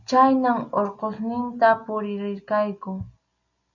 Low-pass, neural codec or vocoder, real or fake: 7.2 kHz; none; real